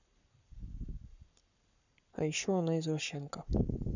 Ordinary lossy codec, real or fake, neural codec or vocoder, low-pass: none; fake; codec, 44.1 kHz, 7.8 kbps, Pupu-Codec; 7.2 kHz